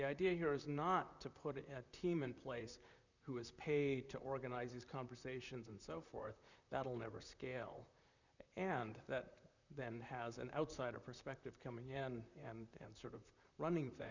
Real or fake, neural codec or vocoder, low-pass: fake; vocoder, 44.1 kHz, 128 mel bands, Pupu-Vocoder; 7.2 kHz